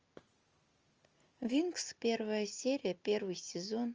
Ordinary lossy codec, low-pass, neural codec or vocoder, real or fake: Opus, 24 kbps; 7.2 kHz; none; real